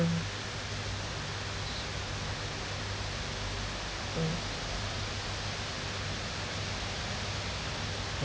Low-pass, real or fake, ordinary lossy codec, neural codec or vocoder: none; real; none; none